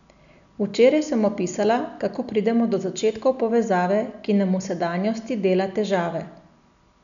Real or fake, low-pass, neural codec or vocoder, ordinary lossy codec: real; 7.2 kHz; none; none